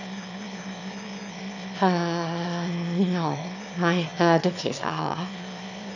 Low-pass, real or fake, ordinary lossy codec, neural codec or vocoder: 7.2 kHz; fake; none; autoencoder, 22.05 kHz, a latent of 192 numbers a frame, VITS, trained on one speaker